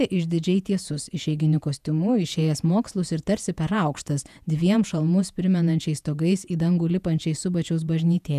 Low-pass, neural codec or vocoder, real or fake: 14.4 kHz; vocoder, 48 kHz, 128 mel bands, Vocos; fake